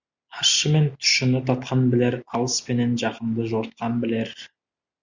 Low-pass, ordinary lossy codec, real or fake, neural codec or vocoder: 7.2 kHz; Opus, 64 kbps; real; none